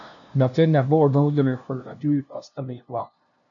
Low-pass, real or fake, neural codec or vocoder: 7.2 kHz; fake; codec, 16 kHz, 0.5 kbps, FunCodec, trained on LibriTTS, 25 frames a second